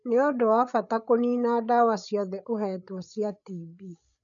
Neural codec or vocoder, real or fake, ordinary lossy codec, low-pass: codec, 16 kHz, 8 kbps, FreqCodec, larger model; fake; none; 7.2 kHz